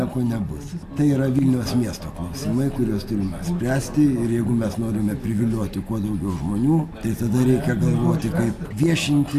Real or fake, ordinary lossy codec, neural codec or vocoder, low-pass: real; AAC, 64 kbps; none; 14.4 kHz